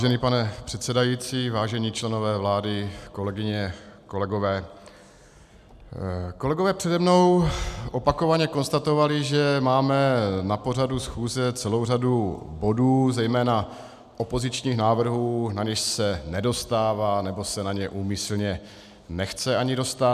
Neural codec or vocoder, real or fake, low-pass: none; real; 14.4 kHz